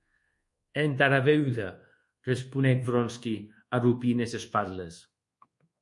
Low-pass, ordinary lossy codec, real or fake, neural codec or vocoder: 10.8 kHz; MP3, 48 kbps; fake; codec, 24 kHz, 1.2 kbps, DualCodec